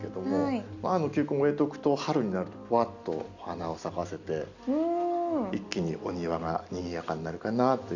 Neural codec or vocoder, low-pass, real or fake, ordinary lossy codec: none; 7.2 kHz; real; none